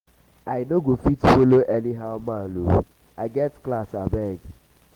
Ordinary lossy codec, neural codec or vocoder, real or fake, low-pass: Opus, 16 kbps; none; real; 19.8 kHz